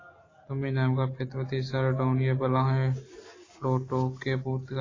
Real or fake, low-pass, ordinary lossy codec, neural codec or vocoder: real; 7.2 kHz; AAC, 32 kbps; none